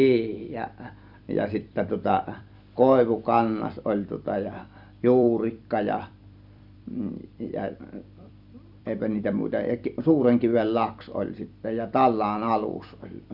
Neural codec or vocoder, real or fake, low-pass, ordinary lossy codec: vocoder, 44.1 kHz, 128 mel bands every 256 samples, BigVGAN v2; fake; 5.4 kHz; none